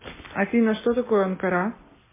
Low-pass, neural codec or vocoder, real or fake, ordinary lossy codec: 3.6 kHz; none; real; MP3, 16 kbps